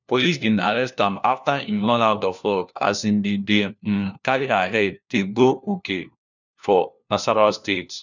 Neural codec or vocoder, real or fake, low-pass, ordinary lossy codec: codec, 16 kHz, 1 kbps, FunCodec, trained on LibriTTS, 50 frames a second; fake; 7.2 kHz; none